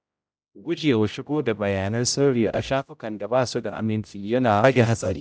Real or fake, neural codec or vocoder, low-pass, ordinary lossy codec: fake; codec, 16 kHz, 0.5 kbps, X-Codec, HuBERT features, trained on general audio; none; none